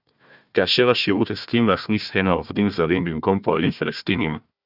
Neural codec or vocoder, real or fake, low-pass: codec, 16 kHz, 1 kbps, FunCodec, trained on Chinese and English, 50 frames a second; fake; 5.4 kHz